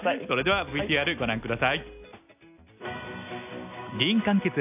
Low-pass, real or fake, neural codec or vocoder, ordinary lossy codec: 3.6 kHz; real; none; AAC, 32 kbps